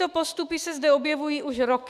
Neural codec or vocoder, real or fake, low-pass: autoencoder, 48 kHz, 128 numbers a frame, DAC-VAE, trained on Japanese speech; fake; 14.4 kHz